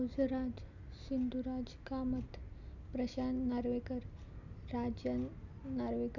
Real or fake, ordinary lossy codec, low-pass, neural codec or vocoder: real; none; 7.2 kHz; none